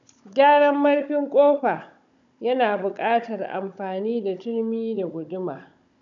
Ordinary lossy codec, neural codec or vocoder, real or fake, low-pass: none; codec, 16 kHz, 16 kbps, FunCodec, trained on Chinese and English, 50 frames a second; fake; 7.2 kHz